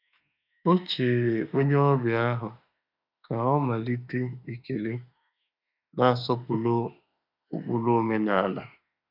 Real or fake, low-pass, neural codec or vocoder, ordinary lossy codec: fake; 5.4 kHz; autoencoder, 48 kHz, 32 numbers a frame, DAC-VAE, trained on Japanese speech; none